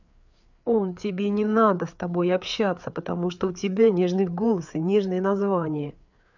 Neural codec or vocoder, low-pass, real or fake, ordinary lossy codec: codec, 16 kHz, 4 kbps, FreqCodec, larger model; 7.2 kHz; fake; none